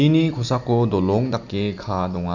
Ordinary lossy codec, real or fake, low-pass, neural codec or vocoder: none; real; 7.2 kHz; none